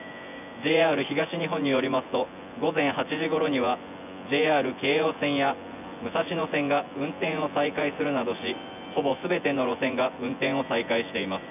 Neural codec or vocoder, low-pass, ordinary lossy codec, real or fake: vocoder, 24 kHz, 100 mel bands, Vocos; 3.6 kHz; none; fake